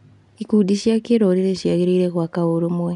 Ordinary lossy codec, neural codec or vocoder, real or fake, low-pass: none; none; real; 10.8 kHz